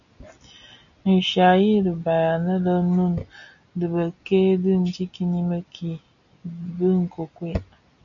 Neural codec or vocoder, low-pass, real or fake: none; 7.2 kHz; real